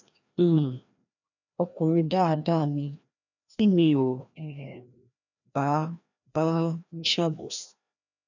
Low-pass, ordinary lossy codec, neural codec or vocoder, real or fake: 7.2 kHz; none; codec, 16 kHz, 1 kbps, FreqCodec, larger model; fake